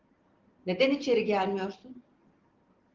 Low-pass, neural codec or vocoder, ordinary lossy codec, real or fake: 7.2 kHz; none; Opus, 16 kbps; real